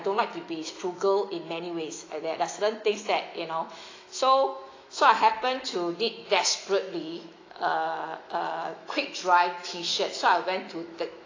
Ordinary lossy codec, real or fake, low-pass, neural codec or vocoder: AAC, 32 kbps; fake; 7.2 kHz; autoencoder, 48 kHz, 128 numbers a frame, DAC-VAE, trained on Japanese speech